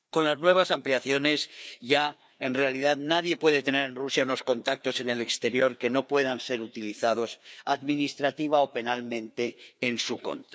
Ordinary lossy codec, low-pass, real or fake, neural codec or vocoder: none; none; fake; codec, 16 kHz, 2 kbps, FreqCodec, larger model